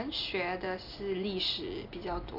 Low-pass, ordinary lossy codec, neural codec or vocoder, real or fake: 5.4 kHz; none; none; real